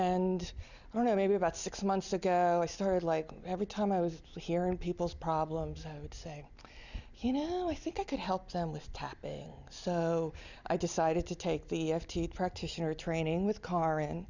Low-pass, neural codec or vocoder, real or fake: 7.2 kHz; none; real